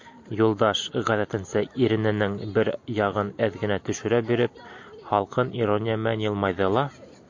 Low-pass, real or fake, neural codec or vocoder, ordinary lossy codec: 7.2 kHz; real; none; MP3, 48 kbps